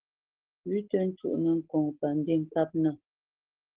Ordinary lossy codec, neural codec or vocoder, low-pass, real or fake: Opus, 16 kbps; none; 3.6 kHz; real